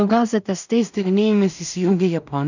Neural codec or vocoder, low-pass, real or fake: codec, 16 kHz in and 24 kHz out, 0.4 kbps, LongCat-Audio-Codec, two codebook decoder; 7.2 kHz; fake